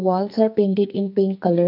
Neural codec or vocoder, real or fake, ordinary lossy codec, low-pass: codec, 44.1 kHz, 2.6 kbps, SNAC; fake; AAC, 48 kbps; 5.4 kHz